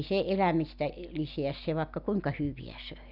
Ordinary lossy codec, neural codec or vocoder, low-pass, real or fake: none; none; 5.4 kHz; real